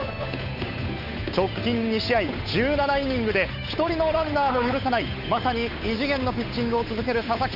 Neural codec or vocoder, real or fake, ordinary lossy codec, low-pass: codec, 16 kHz, 8 kbps, FunCodec, trained on Chinese and English, 25 frames a second; fake; none; 5.4 kHz